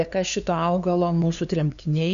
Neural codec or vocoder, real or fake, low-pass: codec, 16 kHz, 2 kbps, FunCodec, trained on LibriTTS, 25 frames a second; fake; 7.2 kHz